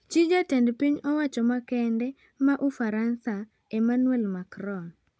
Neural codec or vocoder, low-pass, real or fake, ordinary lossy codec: none; none; real; none